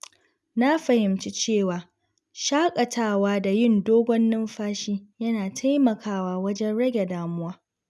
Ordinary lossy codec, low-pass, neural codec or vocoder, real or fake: none; none; none; real